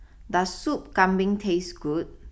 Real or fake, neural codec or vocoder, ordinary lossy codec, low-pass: real; none; none; none